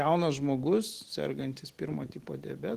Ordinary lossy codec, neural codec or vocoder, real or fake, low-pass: Opus, 16 kbps; none; real; 14.4 kHz